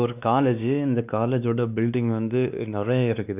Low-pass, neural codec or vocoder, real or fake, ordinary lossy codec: 3.6 kHz; codec, 16 kHz, 2 kbps, X-Codec, WavLM features, trained on Multilingual LibriSpeech; fake; none